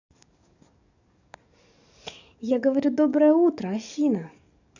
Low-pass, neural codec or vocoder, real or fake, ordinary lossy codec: 7.2 kHz; codec, 44.1 kHz, 7.8 kbps, DAC; fake; none